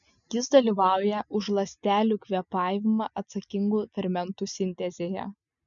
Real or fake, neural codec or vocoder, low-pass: real; none; 7.2 kHz